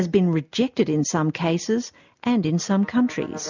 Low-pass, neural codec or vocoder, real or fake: 7.2 kHz; none; real